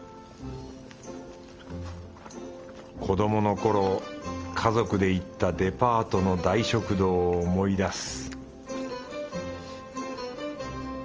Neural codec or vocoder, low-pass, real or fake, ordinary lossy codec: none; 7.2 kHz; real; Opus, 24 kbps